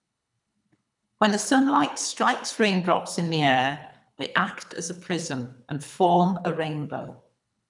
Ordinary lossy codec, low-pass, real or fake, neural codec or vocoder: none; 10.8 kHz; fake; codec, 24 kHz, 3 kbps, HILCodec